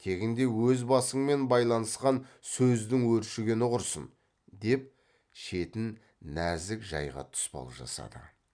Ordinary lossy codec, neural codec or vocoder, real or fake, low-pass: none; none; real; 9.9 kHz